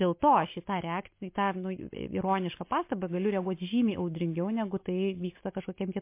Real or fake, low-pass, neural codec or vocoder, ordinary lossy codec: real; 3.6 kHz; none; MP3, 24 kbps